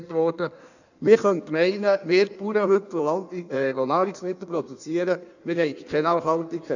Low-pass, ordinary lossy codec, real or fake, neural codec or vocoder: 7.2 kHz; none; fake; codec, 16 kHz in and 24 kHz out, 1.1 kbps, FireRedTTS-2 codec